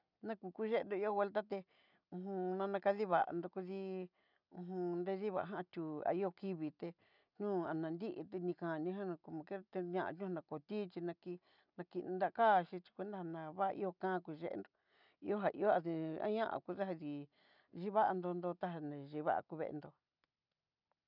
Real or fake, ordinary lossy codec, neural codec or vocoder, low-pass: real; none; none; 5.4 kHz